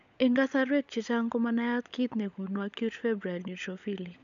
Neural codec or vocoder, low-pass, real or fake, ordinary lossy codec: codec, 16 kHz, 16 kbps, FunCodec, trained on LibriTTS, 50 frames a second; 7.2 kHz; fake; none